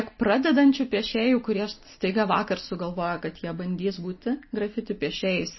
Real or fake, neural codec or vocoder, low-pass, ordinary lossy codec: real; none; 7.2 kHz; MP3, 24 kbps